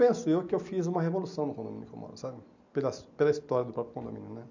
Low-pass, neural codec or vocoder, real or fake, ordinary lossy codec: 7.2 kHz; none; real; none